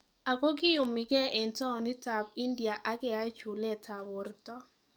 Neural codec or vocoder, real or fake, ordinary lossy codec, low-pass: codec, 44.1 kHz, 7.8 kbps, DAC; fake; none; none